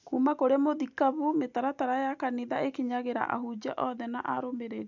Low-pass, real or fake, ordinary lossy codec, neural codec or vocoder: 7.2 kHz; real; none; none